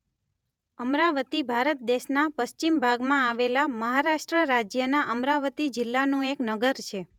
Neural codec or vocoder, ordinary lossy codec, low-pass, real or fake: vocoder, 48 kHz, 128 mel bands, Vocos; none; 19.8 kHz; fake